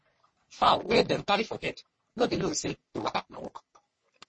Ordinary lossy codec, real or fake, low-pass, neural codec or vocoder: MP3, 32 kbps; fake; 9.9 kHz; codec, 44.1 kHz, 3.4 kbps, Pupu-Codec